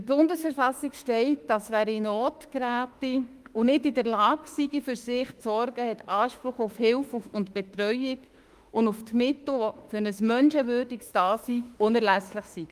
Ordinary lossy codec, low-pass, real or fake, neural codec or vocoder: Opus, 32 kbps; 14.4 kHz; fake; autoencoder, 48 kHz, 32 numbers a frame, DAC-VAE, trained on Japanese speech